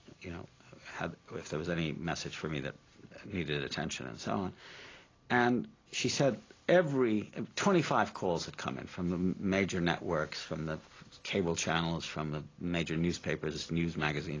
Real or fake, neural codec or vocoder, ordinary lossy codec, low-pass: real; none; AAC, 32 kbps; 7.2 kHz